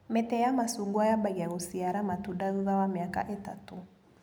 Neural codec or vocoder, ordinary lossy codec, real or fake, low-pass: none; none; real; none